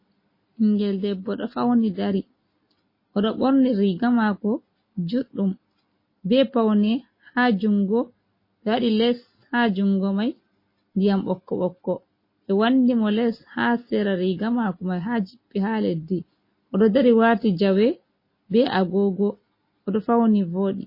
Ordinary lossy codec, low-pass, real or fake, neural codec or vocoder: MP3, 24 kbps; 5.4 kHz; real; none